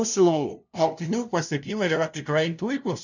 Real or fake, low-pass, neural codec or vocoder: fake; 7.2 kHz; codec, 16 kHz, 0.5 kbps, FunCodec, trained on LibriTTS, 25 frames a second